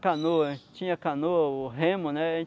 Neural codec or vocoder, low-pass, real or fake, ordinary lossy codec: none; none; real; none